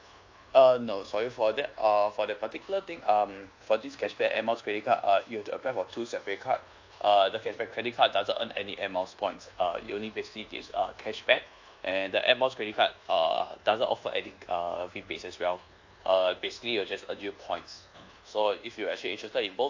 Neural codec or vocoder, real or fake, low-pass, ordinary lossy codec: codec, 24 kHz, 1.2 kbps, DualCodec; fake; 7.2 kHz; AAC, 48 kbps